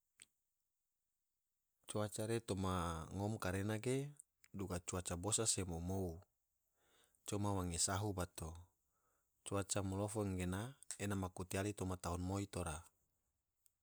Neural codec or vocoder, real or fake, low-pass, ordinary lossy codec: none; real; none; none